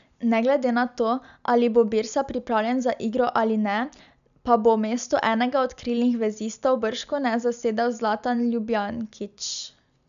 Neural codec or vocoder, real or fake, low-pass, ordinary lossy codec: none; real; 7.2 kHz; none